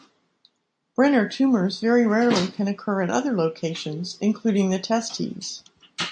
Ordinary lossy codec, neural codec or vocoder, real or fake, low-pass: MP3, 64 kbps; none; real; 9.9 kHz